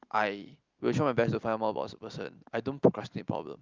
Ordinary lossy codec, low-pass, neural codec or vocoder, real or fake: Opus, 32 kbps; 7.2 kHz; none; real